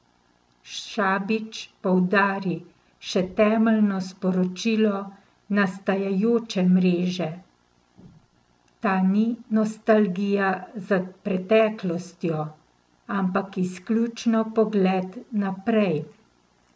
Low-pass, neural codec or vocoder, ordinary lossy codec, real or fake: none; none; none; real